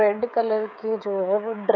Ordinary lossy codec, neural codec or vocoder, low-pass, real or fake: none; codec, 16 kHz, 16 kbps, FreqCodec, smaller model; 7.2 kHz; fake